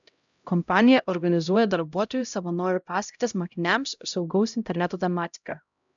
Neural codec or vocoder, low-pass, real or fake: codec, 16 kHz, 0.5 kbps, X-Codec, HuBERT features, trained on LibriSpeech; 7.2 kHz; fake